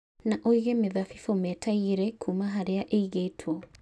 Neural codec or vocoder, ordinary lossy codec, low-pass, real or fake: none; none; none; real